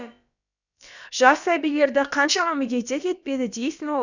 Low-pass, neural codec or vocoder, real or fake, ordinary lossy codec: 7.2 kHz; codec, 16 kHz, about 1 kbps, DyCAST, with the encoder's durations; fake; none